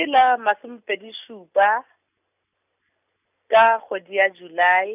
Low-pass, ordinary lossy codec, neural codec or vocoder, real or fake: 3.6 kHz; none; none; real